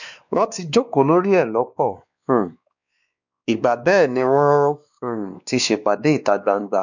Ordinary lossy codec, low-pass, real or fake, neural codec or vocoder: none; 7.2 kHz; fake; codec, 16 kHz, 2 kbps, X-Codec, WavLM features, trained on Multilingual LibriSpeech